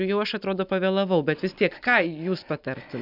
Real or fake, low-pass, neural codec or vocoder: real; 5.4 kHz; none